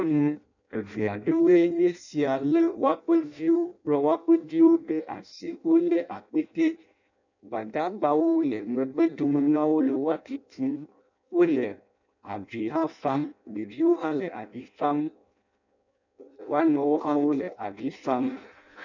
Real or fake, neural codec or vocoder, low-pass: fake; codec, 16 kHz in and 24 kHz out, 0.6 kbps, FireRedTTS-2 codec; 7.2 kHz